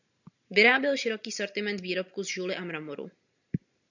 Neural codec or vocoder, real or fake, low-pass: none; real; 7.2 kHz